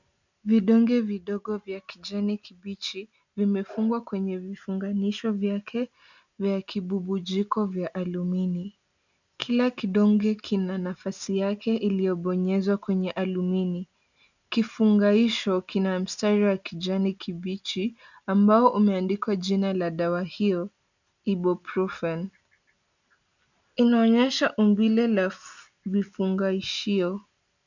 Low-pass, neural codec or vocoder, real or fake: 7.2 kHz; none; real